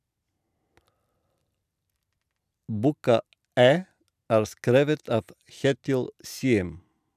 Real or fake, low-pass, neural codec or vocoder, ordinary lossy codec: real; 14.4 kHz; none; none